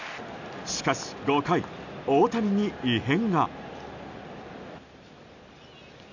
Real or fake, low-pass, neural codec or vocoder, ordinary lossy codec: real; 7.2 kHz; none; none